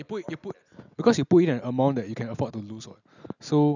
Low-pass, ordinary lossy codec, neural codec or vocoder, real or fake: 7.2 kHz; none; none; real